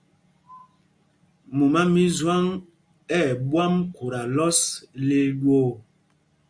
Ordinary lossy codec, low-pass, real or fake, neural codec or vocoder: Opus, 64 kbps; 9.9 kHz; real; none